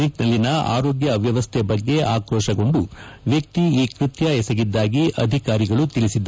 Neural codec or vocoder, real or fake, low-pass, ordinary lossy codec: none; real; none; none